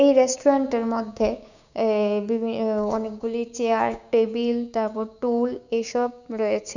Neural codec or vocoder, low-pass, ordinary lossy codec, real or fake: codec, 16 kHz, 6 kbps, DAC; 7.2 kHz; none; fake